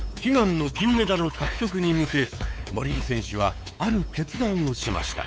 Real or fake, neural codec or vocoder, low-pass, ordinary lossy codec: fake; codec, 16 kHz, 4 kbps, X-Codec, WavLM features, trained on Multilingual LibriSpeech; none; none